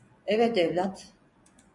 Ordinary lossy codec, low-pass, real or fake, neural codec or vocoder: MP3, 96 kbps; 10.8 kHz; fake; vocoder, 24 kHz, 100 mel bands, Vocos